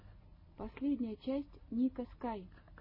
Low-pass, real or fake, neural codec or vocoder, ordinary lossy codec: 5.4 kHz; real; none; MP3, 24 kbps